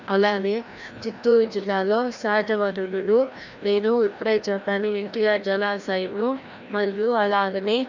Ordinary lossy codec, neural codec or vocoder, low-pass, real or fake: none; codec, 16 kHz, 1 kbps, FreqCodec, larger model; 7.2 kHz; fake